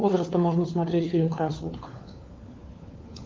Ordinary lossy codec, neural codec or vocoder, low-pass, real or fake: Opus, 32 kbps; codec, 16 kHz, 4 kbps, FunCodec, trained on LibriTTS, 50 frames a second; 7.2 kHz; fake